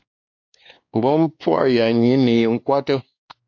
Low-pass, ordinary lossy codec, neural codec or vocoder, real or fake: 7.2 kHz; AAC, 48 kbps; codec, 16 kHz, 2 kbps, X-Codec, WavLM features, trained on Multilingual LibriSpeech; fake